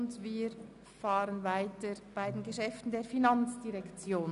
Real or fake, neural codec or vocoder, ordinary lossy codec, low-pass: real; none; none; 10.8 kHz